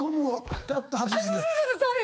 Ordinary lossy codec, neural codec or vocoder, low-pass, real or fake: none; codec, 16 kHz, 4 kbps, X-Codec, HuBERT features, trained on balanced general audio; none; fake